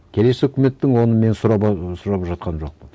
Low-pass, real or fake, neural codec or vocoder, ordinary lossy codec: none; real; none; none